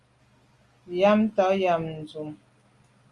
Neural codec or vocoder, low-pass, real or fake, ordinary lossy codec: none; 10.8 kHz; real; Opus, 32 kbps